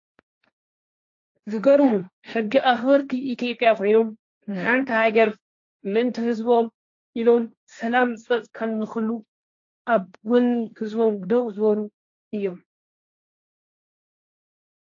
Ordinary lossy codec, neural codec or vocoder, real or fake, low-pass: AAC, 48 kbps; codec, 16 kHz, 1.1 kbps, Voila-Tokenizer; fake; 7.2 kHz